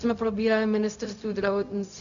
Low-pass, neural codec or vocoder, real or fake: 7.2 kHz; codec, 16 kHz, 0.4 kbps, LongCat-Audio-Codec; fake